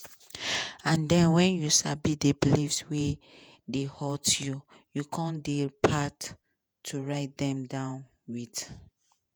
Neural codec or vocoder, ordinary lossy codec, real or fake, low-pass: vocoder, 48 kHz, 128 mel bands, Vocos; none; fake; none